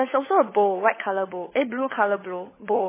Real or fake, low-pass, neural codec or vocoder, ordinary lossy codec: fake; 3.6 kHz; codec, 16 kHz, 16 kbps, FunCodec, trained on LibriTTS, 50 frames a second; MP3, 16 kbps